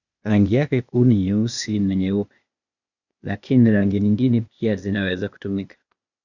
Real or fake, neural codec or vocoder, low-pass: fake; codec, 16 kHz, 0.8 kbps, ZipCodec; 7.2 kHz